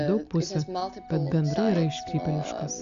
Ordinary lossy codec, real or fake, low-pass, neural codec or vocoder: Opus, 64 kbps; real; 7.2 kHz; none